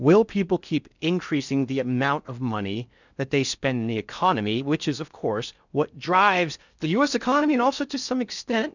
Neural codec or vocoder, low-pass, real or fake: codec, 16 kHz in and 24 kHz out, 0.6 kbps, FocalCodec, streaming, 4096 codes; 7.2 kHz; fake